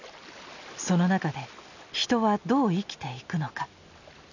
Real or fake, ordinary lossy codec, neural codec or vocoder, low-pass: real; none; none; 7.2 kHz